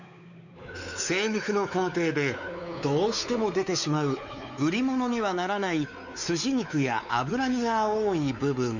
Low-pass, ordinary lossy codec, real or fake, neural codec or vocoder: 7.2 kHz; none; fake; codec, 16 kHz, 4 kbps, X-Codec, WavLM features, trained on Multilingual LibriSpeech